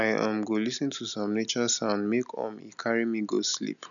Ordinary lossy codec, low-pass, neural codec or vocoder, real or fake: none; 7.2 kHz; none; real